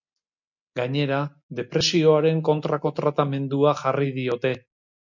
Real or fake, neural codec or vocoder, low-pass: real; none; 7.2 kHz